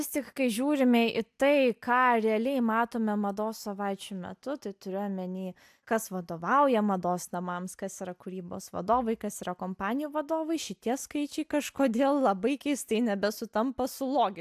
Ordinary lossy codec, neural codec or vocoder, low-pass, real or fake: AAC, 96 kbps; none; 14.4 kHz; real